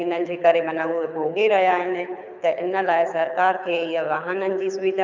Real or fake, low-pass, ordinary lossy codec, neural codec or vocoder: fake; 7.2 kHz; none; codec, 24 kHz, 6 kbps, HILCodec